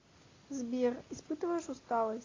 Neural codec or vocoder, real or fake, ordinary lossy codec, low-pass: none; real; AAC, 32 kbps; 7.2 kHz